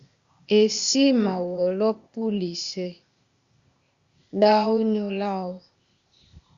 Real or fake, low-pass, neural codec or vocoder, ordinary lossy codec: fake; 7.2 kHz; codec, 16 kHz, 0.8 kbps, ZipCodec; Opus, 64 kbps